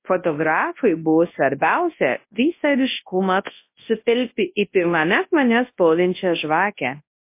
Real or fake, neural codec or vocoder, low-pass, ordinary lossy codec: fake; codec, 24 kHz, 0.9 kbps, WavTokenizer, large speech release; 3.6 kHz; MP3, 24 kbps